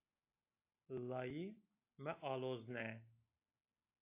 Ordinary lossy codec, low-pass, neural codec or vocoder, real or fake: AAC, 24 kbps; 3.6 kHz; none; real